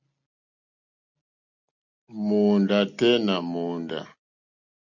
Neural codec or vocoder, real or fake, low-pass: none; real; 7.2 kHz